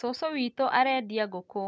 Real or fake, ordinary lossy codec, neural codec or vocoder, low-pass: real; none; none; none